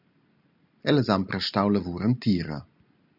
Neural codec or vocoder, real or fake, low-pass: none; real; 5.4 kHz